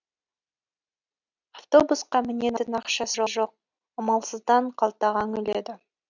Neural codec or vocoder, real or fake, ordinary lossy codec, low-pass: none; real; none; 7.2 kHz